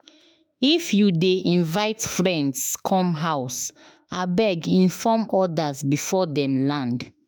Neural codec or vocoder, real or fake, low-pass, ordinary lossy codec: autoencoder, 48 kHz, 32 numbers a frame, DAC-VAE, trained on Japanese speech; fake; none; none